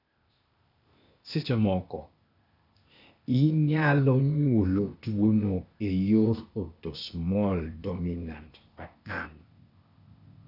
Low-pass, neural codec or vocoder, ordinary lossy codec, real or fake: 5.4 kHz; codec, 16 kHz, 0.8 kbps, ZipCodec; none; fake